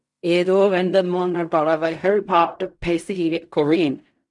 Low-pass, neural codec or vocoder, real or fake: 10.8 kHz; codec, 16 kHz in and 24 kHz out, 0.4 kbps, LongCat-Audio-Codec, fine tuned four codebook decoder; fake